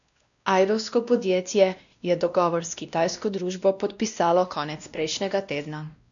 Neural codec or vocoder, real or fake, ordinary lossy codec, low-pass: codec, 16 kHz, 1 kbps, X-Codec, WavLM features, trained on Multilingual LibriSpeech; fake; none; 7.2 kHz